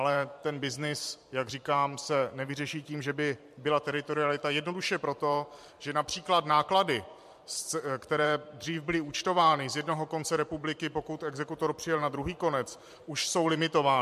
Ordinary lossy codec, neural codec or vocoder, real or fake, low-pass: MP3, 64 kbps; vocoder, 44.1 kHz, 128 mel bands every 512 samples, BigVGAN v2; fake; 14.4 kHz